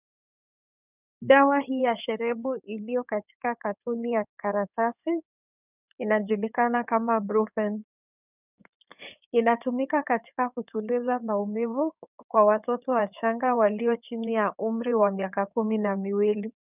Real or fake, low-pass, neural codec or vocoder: fake; 3.6 kHz; codec, 16 kHz in and 24 kHz out, 2.2 kbps, FireRedTTS-2 codec